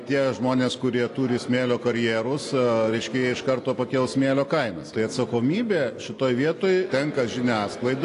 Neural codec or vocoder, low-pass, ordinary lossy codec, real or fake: none; 10.8 kHz; AAC, 48 kbps; real